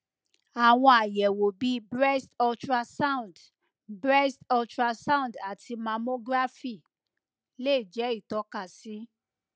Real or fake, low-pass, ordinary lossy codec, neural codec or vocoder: real; none; none; none